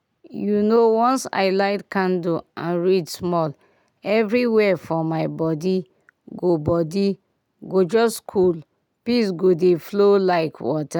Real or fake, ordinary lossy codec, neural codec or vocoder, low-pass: real; none; none; 19.8 kHz